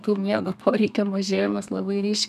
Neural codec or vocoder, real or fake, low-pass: codec, 32 kHz, 1.9 kbps, SNAC; fake; 14.4 kHz